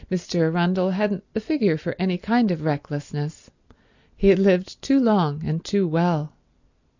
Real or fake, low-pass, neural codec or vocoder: real; 7.2 kHz; none